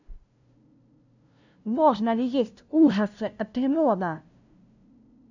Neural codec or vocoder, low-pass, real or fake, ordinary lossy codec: codec, 16 kHz, 0.5 kbps, FunCodec, trained on LibriTTS, 25 frames a second; 7.2 kHz; fake; none